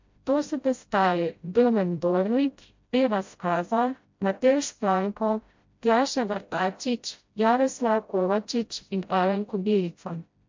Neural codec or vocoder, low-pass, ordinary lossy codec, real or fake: codec, 16 kHz, 0.5 kbps, FreqCodec, smaller model; 7.2 kHz; MP3, 48 kbps; fake